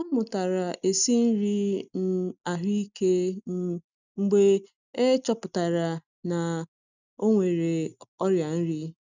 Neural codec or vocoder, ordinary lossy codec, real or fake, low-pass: none; none; real; 7.2 kHz